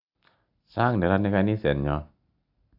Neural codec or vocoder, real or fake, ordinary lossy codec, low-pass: none; real; AAC, 48 kbps; 5.4 kHz